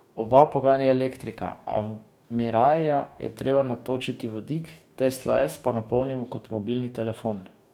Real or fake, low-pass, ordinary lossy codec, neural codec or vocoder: fake; 19.8 kHz; none; codec, 44.1 kHz, 2.6 kbps, DAC